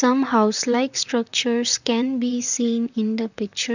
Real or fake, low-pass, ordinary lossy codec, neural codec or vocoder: fake; 7.2 kHz; none; vocoder, 44.1 kHz, 128 mel bands, Pupu-Vocoder